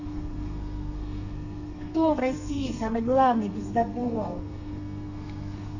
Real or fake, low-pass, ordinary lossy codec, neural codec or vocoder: fake; 7.2 kHz; none; codec, 32 kHz, 1.9 kbps, SNAC